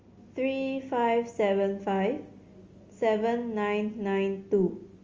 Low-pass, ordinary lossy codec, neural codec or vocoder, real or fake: 7.2 kHz; Opus, 32 kbps; none; real